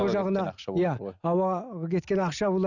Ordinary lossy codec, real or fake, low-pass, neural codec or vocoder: none; real; 7.2 kHz; none